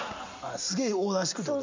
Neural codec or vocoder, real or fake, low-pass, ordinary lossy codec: none; real; 7.2 kHz; MP3, 64 kbps